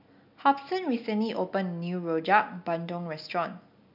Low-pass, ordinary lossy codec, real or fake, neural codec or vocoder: 5.4 kHz; MP3, 48 kbps; real; none